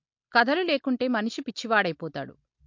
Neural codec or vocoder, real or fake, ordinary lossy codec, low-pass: none; real; MP3, 48 kbps; 7.2 kHz